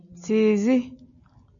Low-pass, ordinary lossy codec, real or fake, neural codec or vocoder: 7.2 kHz; MP3, 96 kbps; real; none